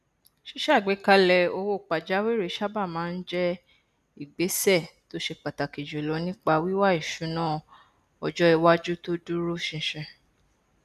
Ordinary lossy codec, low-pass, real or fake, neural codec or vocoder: none; 14.4 kHz; real; none